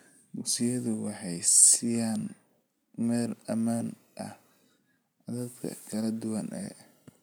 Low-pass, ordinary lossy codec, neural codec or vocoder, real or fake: none; none; none; real